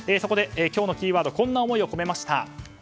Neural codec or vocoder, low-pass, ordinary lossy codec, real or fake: none; none; none; real